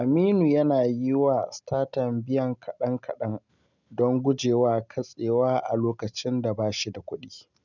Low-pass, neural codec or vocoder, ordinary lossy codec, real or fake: 7.2 kHz; none; none; real